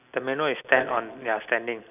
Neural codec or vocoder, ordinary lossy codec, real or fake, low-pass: none; none; real; 3.6 kHz